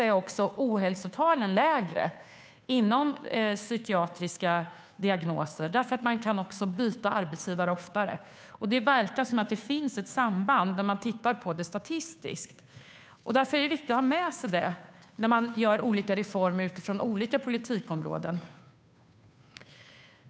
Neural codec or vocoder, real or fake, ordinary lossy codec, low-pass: codec, 16 kHz, 2 kbps, FunCodec, trained on Chinese and English, 25 frames a second; fake; none; none